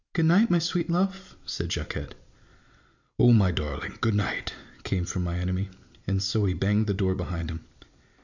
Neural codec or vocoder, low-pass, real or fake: none; 7.2 kHz; real